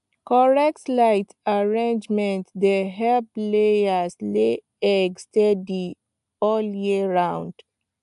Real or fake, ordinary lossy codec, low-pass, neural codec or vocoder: real; AAC, 96 kbps; 10.8 kHz; none